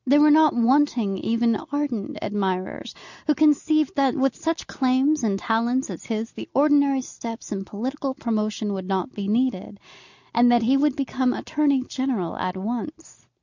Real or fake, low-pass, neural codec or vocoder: real; 7.2 kHz; none